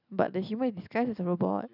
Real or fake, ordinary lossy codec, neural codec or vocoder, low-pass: real; none; none; 5.4 kHz